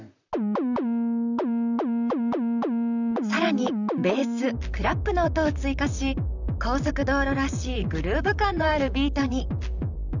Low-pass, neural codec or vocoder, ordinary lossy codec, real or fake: 7.2 kHz; vocoder, 44.1 kHz, 128 mel bands, Pupu-Vocoder; none; fake